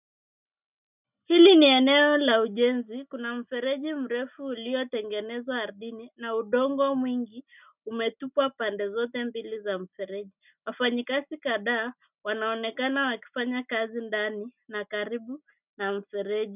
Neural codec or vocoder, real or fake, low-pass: none; real; 3.6 kHz